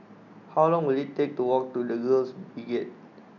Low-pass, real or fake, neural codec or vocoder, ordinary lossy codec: 7.2 kHz; real; none; none